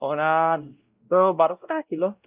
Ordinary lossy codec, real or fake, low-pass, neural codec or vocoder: Opus, 24 kbps; fake; 3.6 kHz; codec, 16 kHz, 0.5 kbps, X-Codec, WavLM features, trained on Multilingual LibriSpeech